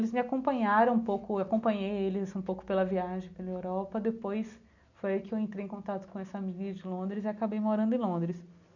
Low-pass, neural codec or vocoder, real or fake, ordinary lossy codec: 7.2 kHz; none; real; none